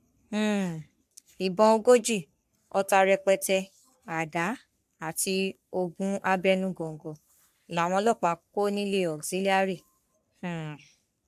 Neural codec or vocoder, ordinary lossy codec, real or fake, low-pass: codec, 44.1 kHz, 3.4 kbps, Pupu-Codec; AAC, 96 kbps; fake; 14.4 kHz